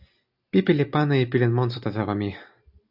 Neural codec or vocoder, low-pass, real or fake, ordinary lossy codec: none; 5.4 kHz; real; AAC, 48 kbps